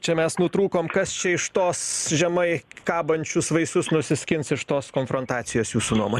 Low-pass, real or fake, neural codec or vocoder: 14.4 kHz; real; none